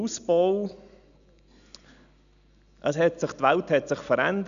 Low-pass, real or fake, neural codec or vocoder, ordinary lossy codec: 7.2 kHz; real; none; none